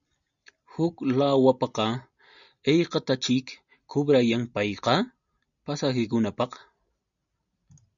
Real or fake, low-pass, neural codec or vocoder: real; 7.2 kHz; none